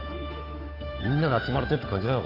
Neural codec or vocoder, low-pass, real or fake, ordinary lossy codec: codec, 16 kHz, 8 kbps, FunCodec, trained on Chinese and English, 25 frames a second; 5.4 kHz; fake; AAC, 24 kbps